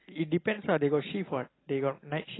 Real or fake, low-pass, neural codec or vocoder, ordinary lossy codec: real; 7.2 kHz; none; AAC, 16 kbps